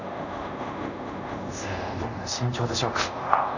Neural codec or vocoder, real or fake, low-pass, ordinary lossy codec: codec, 24 kHz, 0.5 kbps, DualCodec; fake; 7.2 kHz; none